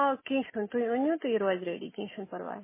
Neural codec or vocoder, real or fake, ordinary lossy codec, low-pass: none; real; MP3, 16 kbps; 3.6 kHz